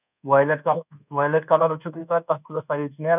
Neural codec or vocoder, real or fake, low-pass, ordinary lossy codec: codec, 24 kHz, 1.2 kbps, DualCodec; fake; 3.6 kHz; none